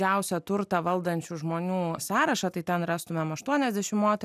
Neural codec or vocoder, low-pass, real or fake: none; 14.4 kHz; real